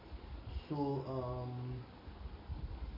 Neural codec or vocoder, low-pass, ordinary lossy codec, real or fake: none; 5.4 kHz; MP3, 24 kbps; real